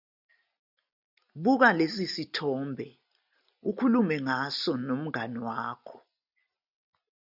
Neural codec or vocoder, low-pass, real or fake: none; 5.4 kHz; real